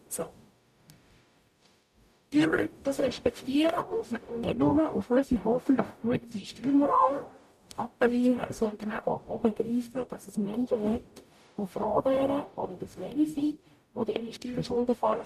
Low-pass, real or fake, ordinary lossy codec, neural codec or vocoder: 14.4 kHz; fake; none; codec, 44.1 kHz, 0.9 kbps, DAC